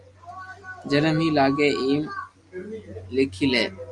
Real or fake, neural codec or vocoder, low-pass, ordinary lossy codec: real; none; 10.8 kHz; Opus, 32 kbps